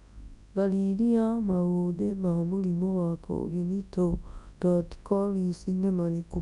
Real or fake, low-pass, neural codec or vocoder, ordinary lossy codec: fake; 10.8 kHz; codec, 24 kHz, 0.9 kbps, WavTokenizer, large speech release; none